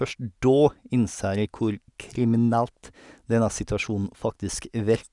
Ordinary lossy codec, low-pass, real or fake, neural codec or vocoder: none; 10.8 kHz; real; none